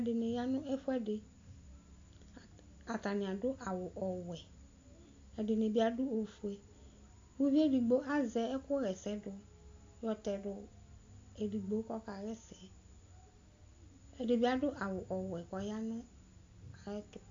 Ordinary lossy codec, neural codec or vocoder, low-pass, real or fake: AAC, 48 kbps; none; 7.2 kHz; real